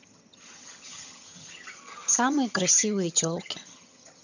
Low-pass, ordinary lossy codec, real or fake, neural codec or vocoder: 7.2 kHz; none; fake; vocoder, 22.05 kHz, 80 mel bands, HiFi-GAN